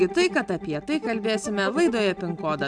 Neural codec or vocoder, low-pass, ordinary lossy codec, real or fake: none; 9.9 kHz; MP3, 96 kbps; real